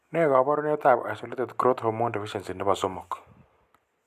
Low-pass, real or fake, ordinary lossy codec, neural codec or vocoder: 14.4 kHz; real; none; none